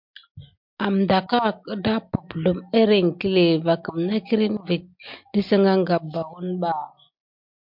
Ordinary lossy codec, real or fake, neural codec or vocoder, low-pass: MP3, 48 kbps; real; none; 5.4 kHz